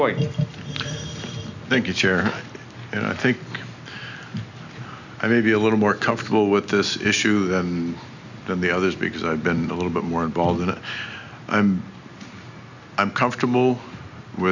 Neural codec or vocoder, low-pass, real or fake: none; 7.2 kHz; real